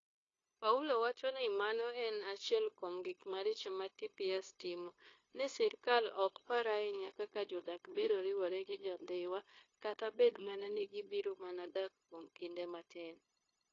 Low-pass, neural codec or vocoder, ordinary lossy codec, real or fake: 7.2 kHz; codec, 16 kHz, 0.9 kbps, LongCat-Audio-Codec; AAC, 32 kbps; fake